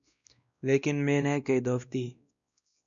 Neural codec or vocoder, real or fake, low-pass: codec, 16 kHz, 1 kbps, X-Codec, WavLM features, trained on Multilingual LibriSpeech; fake; 7.2 kHz